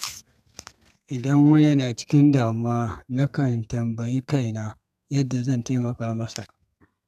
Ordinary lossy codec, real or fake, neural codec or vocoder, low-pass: none; fake; codec, 32 kHz, 1.9 kbps, SNAC; 14.4 kHz